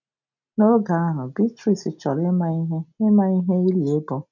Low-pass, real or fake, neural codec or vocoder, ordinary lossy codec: 7.2 kHz; real; none; none